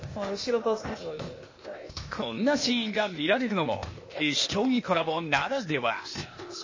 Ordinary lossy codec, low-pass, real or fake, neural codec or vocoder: MP3, 32 kbps; 7.2 kHz; fake; codec, 16 kHz, 0.8 kbps, ZipCodec